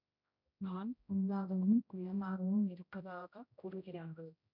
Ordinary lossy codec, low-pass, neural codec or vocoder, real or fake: none; 5.4 kHz; codec, 16 kHz, 0.5 kbps, X-Codec, HuBERT features, trained on general audio; fake